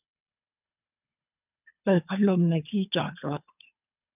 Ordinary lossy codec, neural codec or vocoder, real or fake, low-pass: none; codec, 24 kHz, 3 kbps, HILCodec; fake; 3.6 kHz